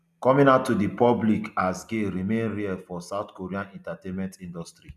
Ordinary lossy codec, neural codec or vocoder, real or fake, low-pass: none; none; real; 14.4 kHz